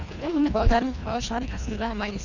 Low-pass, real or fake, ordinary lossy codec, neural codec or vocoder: 7.2 kHz; fake; none; codec, 24 kHz, 1.5 kbps, HILCodec